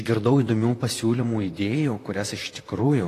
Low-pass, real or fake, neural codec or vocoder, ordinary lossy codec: 14.4 kHz; fake; vocoder, 44.1 kHz, 128 mel bands, Pupu-Vocoder; AAC, 48 kbps